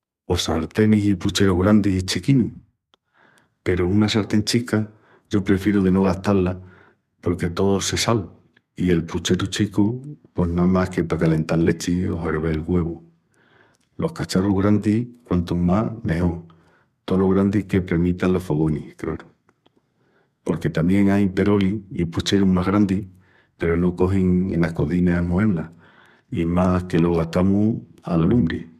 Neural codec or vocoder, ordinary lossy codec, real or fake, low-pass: codec, 32 kHz, 1.9 kbps, SNAC; MP3, 96 kbps; fake; 14.4 kHz